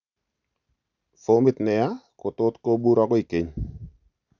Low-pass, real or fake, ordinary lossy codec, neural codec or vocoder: 7.2 kHz; real; none; none